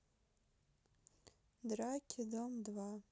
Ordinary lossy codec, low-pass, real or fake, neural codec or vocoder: none; none; real; none